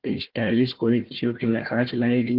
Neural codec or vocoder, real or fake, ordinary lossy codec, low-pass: codec, 16 kHz, 1 kbps, FunCodec, trained on Chinese and English, 50 frames a second; fake; Opus, 16 kbps; 5.4 kHz